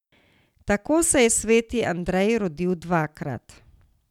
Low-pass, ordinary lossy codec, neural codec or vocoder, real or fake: 19.8 kHz; none; none; real